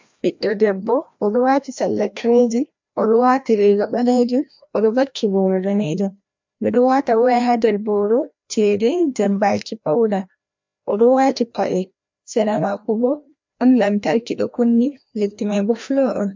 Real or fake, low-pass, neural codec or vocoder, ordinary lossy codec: fake; 7.2 kHz; codec, 16 kHz, 1 kbps, FreqCodec, larger model; MP3, 64 kbps